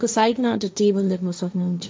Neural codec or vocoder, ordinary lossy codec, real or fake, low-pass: codec, 16 kHz, 1.1 kbps, Voila-Tokenizer; none; fake; none